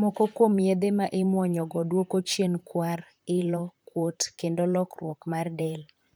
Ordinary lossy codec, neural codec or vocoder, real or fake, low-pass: none; vocoder, 44.1 kHz, 128 mel bands, Pupu-Vocoder; fake; none